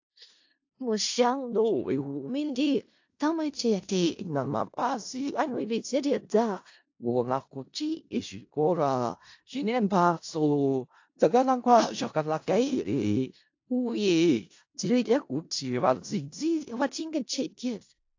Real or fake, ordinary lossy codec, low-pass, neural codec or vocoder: fake; AAC, 48 kbps; 7.2 kHz; codec, 16 kHz in and 24 kHz out, 0.4 kbps, LongCat-Audio-Codec, four codebook decoder